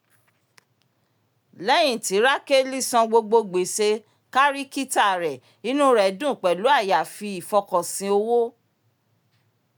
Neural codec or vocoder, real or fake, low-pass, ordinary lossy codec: none; real; none; none